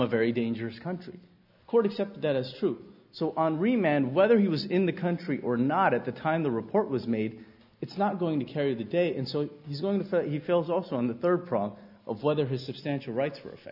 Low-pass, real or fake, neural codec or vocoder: 5.4 kHz; real; none